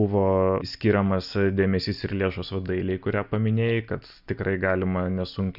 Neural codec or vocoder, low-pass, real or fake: none; 5.4 kHz; real